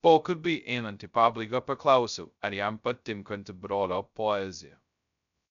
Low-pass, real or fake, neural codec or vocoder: 7.2 kHz; fake; codec, 16 kHz, 0.2 kbps, FocalCodec